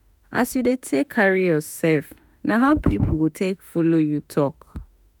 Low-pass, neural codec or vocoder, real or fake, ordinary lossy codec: none; autoencoder, 48 kHz, 32 numbers a frame, DAC-VAE, trained on Japanese speech; fake; none